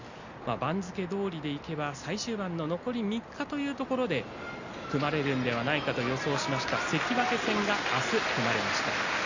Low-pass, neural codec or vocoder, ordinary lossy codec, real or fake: 7.2 kHz; none; Opus, 64 kbps; real